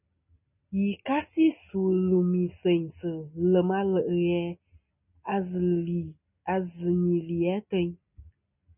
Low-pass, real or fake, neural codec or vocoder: 3.6 kHz; real; none